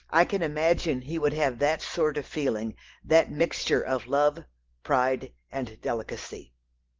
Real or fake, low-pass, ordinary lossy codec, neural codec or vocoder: real; 7.2 kHz; Opus, 16 kbps; none